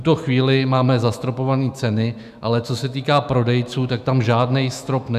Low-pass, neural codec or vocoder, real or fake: 14.4 kHz; autoencoder, 48 kHz, 128 numbers a frame, DAC-VAE, trained on Japanese speech; fake